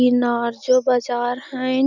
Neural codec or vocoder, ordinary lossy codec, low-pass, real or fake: none; none; 7.2 kHz; real